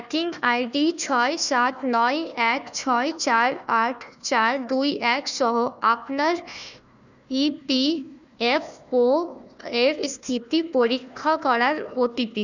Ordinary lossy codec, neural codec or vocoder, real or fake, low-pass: none; codec, 16 kHz, 1 kbps, FunCodec, trained on Chinese and English, 50 frames a second; fake; 7.2 kHz